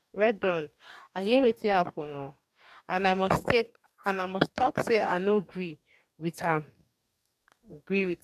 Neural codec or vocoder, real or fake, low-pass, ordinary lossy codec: codec, 44.1 kHz, 2.6 kbps, DAC; fake; 14.4 kHz; MP3, 96 kbps